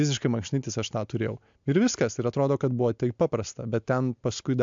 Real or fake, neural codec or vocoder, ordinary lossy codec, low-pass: real; none; MP3, 64 kbps; 7.2 kHz